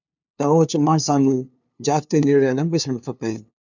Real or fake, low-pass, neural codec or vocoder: fake; 7.2 kHz; codec, 16 kHz, 2 kbps, FunCodec, trained on LibriTTS, 25 frames a second